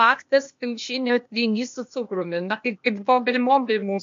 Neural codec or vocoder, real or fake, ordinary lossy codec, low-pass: codec, 16 kHz, 0.8 kbps, ZipCodec; fake; MP3, 48 kbps; 7.2 kHz